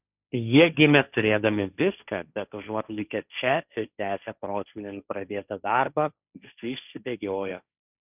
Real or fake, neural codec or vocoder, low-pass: fake; codec, 16 kHz, 1.1 kbps, Voila-Tokenizer; 3.6 kHz